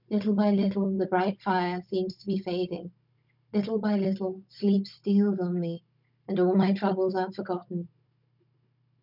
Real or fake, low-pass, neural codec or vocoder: fake; 5.4 kHz; codec, 16 kHz, 16 kbps, FunCodec, trained on Chinese and English, 50 frames a second